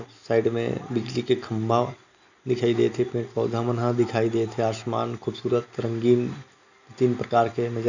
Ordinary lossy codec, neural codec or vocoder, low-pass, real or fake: none; none; 7.2 kHz; real